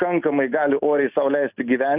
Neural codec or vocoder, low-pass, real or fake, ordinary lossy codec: none; 3.6 kHz; real; Opus, 64 kbps